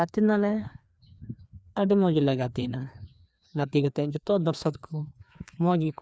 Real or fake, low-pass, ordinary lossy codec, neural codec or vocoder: fake; none; none; codec, 16 kHz, 2 kbps, FreqCodec, larger model